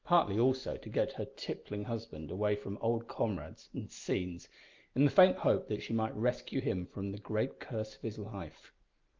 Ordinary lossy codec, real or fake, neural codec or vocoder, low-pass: Opus, 24 kbps; real; none; 7.2 kHz